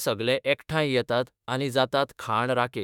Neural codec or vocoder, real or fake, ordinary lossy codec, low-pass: autoencoder, 48 kHz, 32 numbers a frame, DAC-VAE, trained on Japanese speech; fake; none; 19.8 kHz